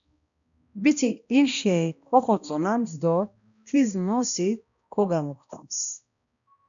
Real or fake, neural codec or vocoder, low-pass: fake; codec, 16 kHz, 1 kbps, X-Codec, HuBERT features, trained on balanced general audio; 7.2 kHz